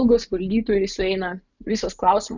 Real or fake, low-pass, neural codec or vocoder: real; 7.2 kHz; none